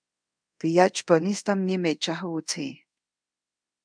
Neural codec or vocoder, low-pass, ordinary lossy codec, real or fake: codec, 24 kHz, 0.5 kbps, DualCodec; 9.9 kHz; MP3, 96 kbps; fake